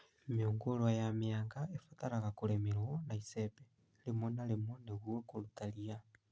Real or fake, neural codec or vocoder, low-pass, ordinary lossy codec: real; none; none; none